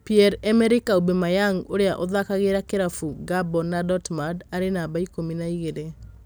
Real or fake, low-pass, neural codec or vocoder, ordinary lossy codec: real; none; none; none